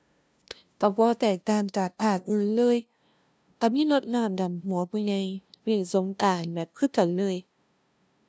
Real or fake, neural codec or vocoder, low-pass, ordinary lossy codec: fake; codec, 16 kHz, 0.5 kbps, FunCodec, trained on LibriTTS, 25 frames a second; none; none